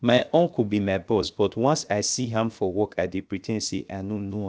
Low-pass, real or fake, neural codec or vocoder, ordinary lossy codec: none; fake; codec, 16 kHz, 0.7 kbps, FocalCodec; none